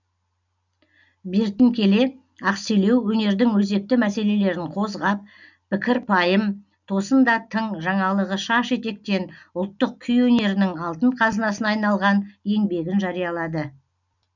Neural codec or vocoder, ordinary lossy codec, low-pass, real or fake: none; none; 7.2 kHz; real